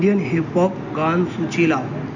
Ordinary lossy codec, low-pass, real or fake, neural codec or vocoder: none; 7.2 kHz; real; none